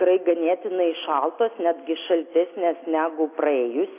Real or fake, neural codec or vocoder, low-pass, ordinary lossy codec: real; none; 3.6 kHz; AAC, 24 kbps